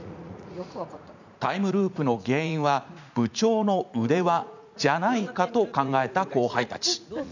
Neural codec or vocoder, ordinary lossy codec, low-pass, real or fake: vocoder, 44.1 kHz, 80 mel bands, Vocos; none; 7.2 kHz; fake